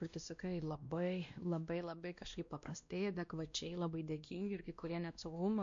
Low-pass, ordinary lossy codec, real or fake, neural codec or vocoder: 7.2 kHz; MP3, 48 kbps; fake; codec, 16 kHz, 1 kbps, X-Codec, WavLM features, trained on Multilingual LibriSpeech